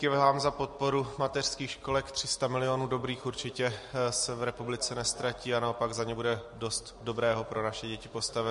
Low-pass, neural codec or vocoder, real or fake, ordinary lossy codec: 14.4 kHz; none; real; MP3, 48 kbps